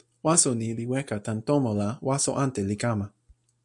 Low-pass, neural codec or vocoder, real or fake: 10.8 kHz; none; real